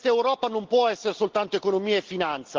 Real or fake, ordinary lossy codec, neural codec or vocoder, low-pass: real; Opus, 16 kbps; none; 7.2 kHz